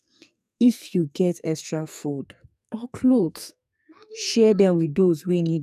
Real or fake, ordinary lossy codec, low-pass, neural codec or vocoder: fake; none; 14.4 kHz; codec, 44.1 kHz, 2.6 kbps, SNAC